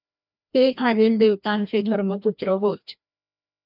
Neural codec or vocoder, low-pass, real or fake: codec, 16 kHz, 1 kbps, FreqCodec, larger model; 5.4 kHz; fake